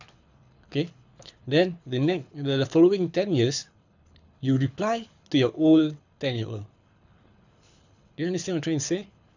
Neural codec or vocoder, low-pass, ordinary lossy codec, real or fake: codec, 24 kHz, 6 kbps, HILCodec; 7.2 kHz; none; fake